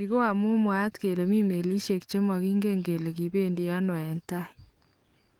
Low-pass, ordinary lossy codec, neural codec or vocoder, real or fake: 19.8 kHz; Opus, 32 kbps; codec, 44.1 kHz, 7.8 kbps, DAC; fake